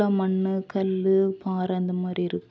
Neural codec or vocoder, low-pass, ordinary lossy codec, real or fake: none; none; none; real